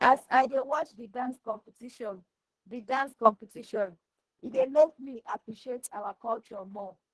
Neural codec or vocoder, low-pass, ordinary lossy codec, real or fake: codec, 24 kHz, 1.5 kbps, HILCodec; 10.8 kHz; Opus, 16 kbps; fake